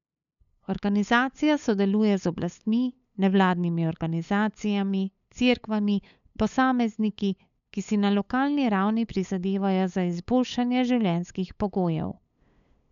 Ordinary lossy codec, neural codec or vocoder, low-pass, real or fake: none; codec, 16 kHz, 8 kbps, FunCodec, trained on LibriTTS, 25 frames a second; 7.2 kHz; fake